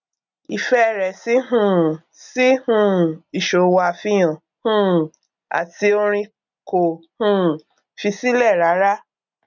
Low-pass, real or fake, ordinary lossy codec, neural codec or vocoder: 7.2 kHz; real; none; none